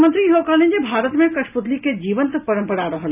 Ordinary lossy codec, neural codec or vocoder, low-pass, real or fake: none; none; 3.6 kHz; real